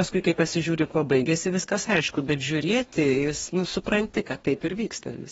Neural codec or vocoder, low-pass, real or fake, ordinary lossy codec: codec, 44.1 kHz, 2.6 kbps, DAC; 19.8 kHz; fake; AAC, 24 kbps